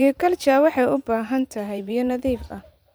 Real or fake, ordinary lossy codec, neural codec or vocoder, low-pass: fake; none; vocoder, 44.1 kHz, 128 mel bands every 512 samples, BigVGAN v2; none